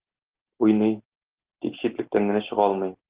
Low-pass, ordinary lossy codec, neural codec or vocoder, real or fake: 3.6 kHz; Opus, 16 kbps; none; real